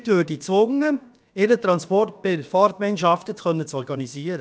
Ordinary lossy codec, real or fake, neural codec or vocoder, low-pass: none; fake; codec, 16 kHz, about 1 kbps, DyCAST, with the encoder's durations; none